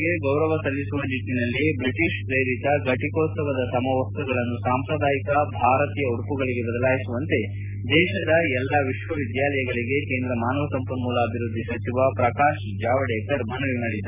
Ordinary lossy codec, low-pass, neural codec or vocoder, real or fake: none; 3.6 kHz; none; real